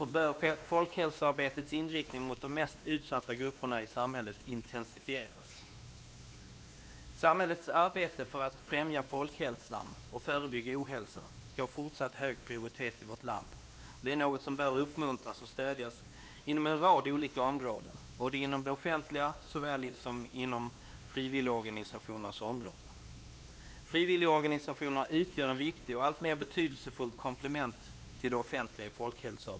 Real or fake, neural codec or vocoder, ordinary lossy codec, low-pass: fake; codec, 16 kHz, 2 kbps, X-Codec, WavLM features, trained on Multilingual LibriSpeech; none; none